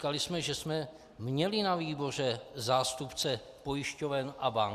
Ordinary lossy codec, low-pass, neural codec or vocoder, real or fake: Opus, 64 kbps; 14.4 kHz; none; real